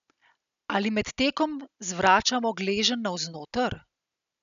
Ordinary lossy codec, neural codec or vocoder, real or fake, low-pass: none; none; real; 7.2 kHz